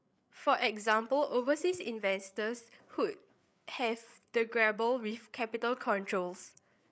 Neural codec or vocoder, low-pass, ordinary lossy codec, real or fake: codec, 16 kHz, 8 kbps, FreqCodec, larger model; none; none; fake